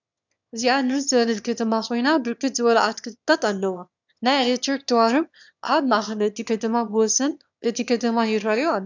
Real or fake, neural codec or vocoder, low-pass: fake; autoencoder, 22.05 kHz, a latent of 192 numbers a frame, VITS, trained on one speaker; 7.2 kHz